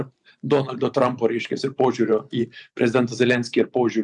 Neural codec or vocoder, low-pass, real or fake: vocoder, 44.1 kHz, 128 mel bands every 512 samples, BigVGAN v2; 10.8 kHz; fake